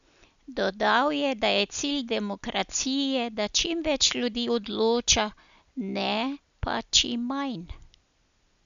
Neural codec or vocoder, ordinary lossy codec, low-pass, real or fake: none; MP3, 96 kbps; 7.2 kHz; real